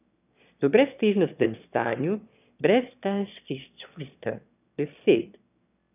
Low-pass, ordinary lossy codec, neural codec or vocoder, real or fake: 3.6 kHz; none; autoencoder, 22.05 kHz, a latent of 192 numbers a frame, VITS, trained on one speaker; fake